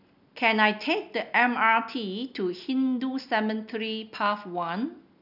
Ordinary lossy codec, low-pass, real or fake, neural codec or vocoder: none; 5.4 kHz; real; none